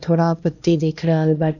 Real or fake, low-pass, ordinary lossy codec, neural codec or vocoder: fake; 7.2 kHz; none; codec, 16 kHz, 1 kbps, X-Codec, HuBERT features, trained on LibriSpeech